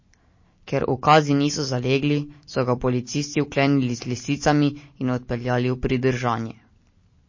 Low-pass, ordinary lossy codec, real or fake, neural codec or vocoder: 7.2 kHz; MP3, 32 kbps; real; none